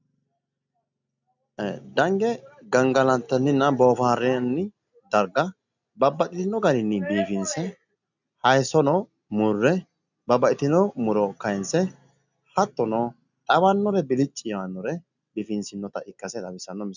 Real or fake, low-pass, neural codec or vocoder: real; 7.2 kHz; none